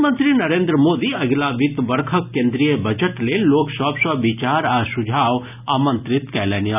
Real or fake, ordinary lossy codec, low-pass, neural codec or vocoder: real; none; 3.6 kHz; none